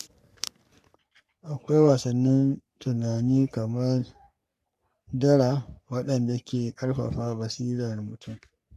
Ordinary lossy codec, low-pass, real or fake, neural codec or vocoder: none; 14.4 kHz; fake; codec, 44.1 kHz, 3.4 kbps, Pupu-Codec